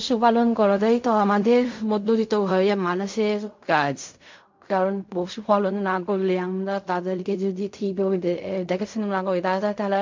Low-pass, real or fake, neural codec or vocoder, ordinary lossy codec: 7.2 kHz; fake; codec, 16 kHz in and 24 kHz out, 0.4 kbps, LongCat-Audio-Codec, fine tuned four codebook decoder; MP3, 48 kbps